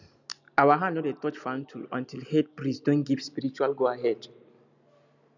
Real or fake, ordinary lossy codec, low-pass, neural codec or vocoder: real; none; 7.2 kHz; none